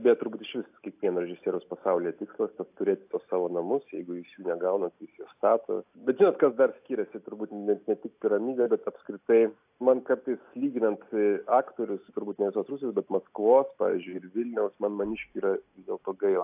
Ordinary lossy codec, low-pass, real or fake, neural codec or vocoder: AAC, 32 kbps; 3.6 kHz; real; none